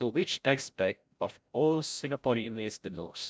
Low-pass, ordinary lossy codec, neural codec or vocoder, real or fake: none; none; codec, 16 kHz, 0.5 kbps, FreqCodec, larger model; fake